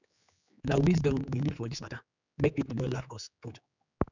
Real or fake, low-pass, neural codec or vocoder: fake; 7.2 kHz; codec, 16 kHz, 4 kbps, X-Codec, HuBERT features, trained on general audio